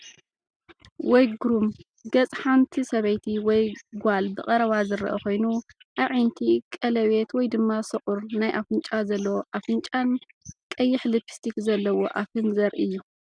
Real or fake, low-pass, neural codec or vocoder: real; 9.9 kHz; none